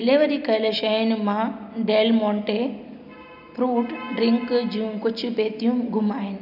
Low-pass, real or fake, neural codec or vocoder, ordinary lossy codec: 5.4 kHz; real; none; none